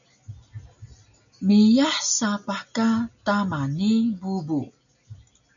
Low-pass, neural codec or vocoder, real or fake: 7.2 kHz; none; real